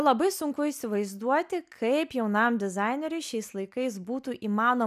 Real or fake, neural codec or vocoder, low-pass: real; none; 14.4 kHz